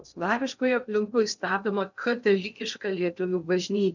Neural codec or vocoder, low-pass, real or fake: codec, 16 kHz in and 24 kHz out, 0.6 kbps, FocalCodec, streaming, 2048 codes; 7.2 kHz; fake